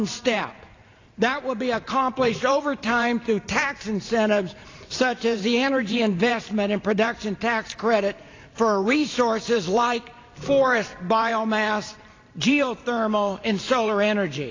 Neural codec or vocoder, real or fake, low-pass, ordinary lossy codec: vocoder, 44.1 kHz, 128 mel bands every 512 samples, BigVGAN v2; fake; 7.2 kHz; AAC, 32 kbps